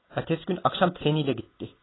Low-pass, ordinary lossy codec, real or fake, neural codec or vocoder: 7.2 kHz; AAC, 16 kbps; real; none